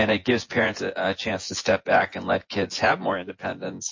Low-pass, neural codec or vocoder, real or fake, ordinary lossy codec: 7.2 kHz; vocoder, 24 kHz, 100 mel bands, Vocos; fake; MP3, 32 kbps